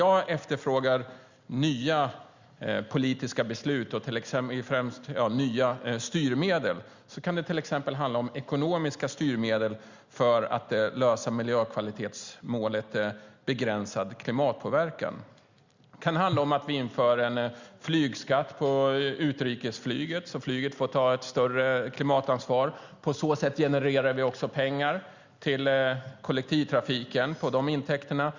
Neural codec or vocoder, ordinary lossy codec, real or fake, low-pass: none; Opus, 64 kbps; real; 7.2 kHz